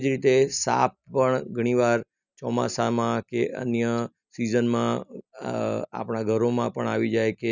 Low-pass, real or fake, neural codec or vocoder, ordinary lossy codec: 7.2 kHz; real; none; none